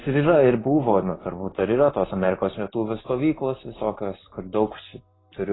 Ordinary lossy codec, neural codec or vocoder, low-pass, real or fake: AAC, 16 kbps; none; 7.2 kHz; real